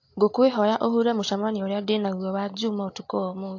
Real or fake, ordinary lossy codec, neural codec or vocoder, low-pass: real; AAC, 48 kbps; none; 7.2 kHz